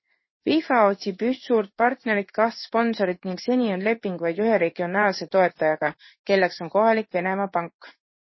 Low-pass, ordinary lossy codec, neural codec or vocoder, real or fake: 7.2 kHz; MP3, 24 kbps; codec, 16 kHz in and 24 kHz out, 1 kbps, XY-Tokenizer; fake